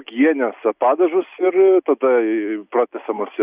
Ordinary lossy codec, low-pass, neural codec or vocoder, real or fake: Opus, 64 kbps; 3.6 kHz; none; real